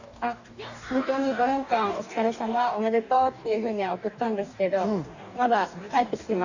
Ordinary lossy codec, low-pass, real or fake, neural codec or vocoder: none; 7.2 kHz; fake; codec, 44.1 kHz, 2.6 kbps, DAC